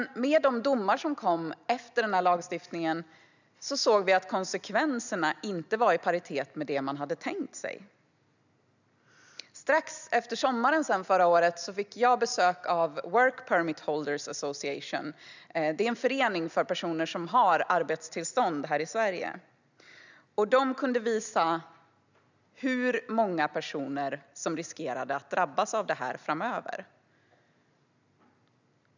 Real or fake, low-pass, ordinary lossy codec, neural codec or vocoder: real; 7.2 kHz; none; none